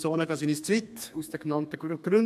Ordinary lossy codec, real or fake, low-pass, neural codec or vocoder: MP3, 96 kbps; fake; 14.4 kHz; codec, 32 kHz, 1.9 kbps, SNAC